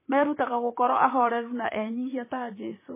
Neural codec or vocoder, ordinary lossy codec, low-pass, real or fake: vocoder, 44.1 kHz, 128 mel bands, Pupu-Vocoder; MP3, 16 kbps; 3.6 kHz; fake